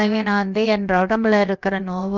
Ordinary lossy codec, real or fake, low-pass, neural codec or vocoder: Opus, 24 kbps; fake; 7.2 kHz; codec, 16 kHz, about 1 kbps, DyCAST, with the encoder's durations